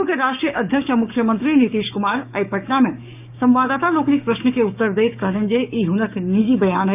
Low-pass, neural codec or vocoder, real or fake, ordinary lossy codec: 3.6 kHz; codec, 16 kHz, 6 kbps, DAC; fake; none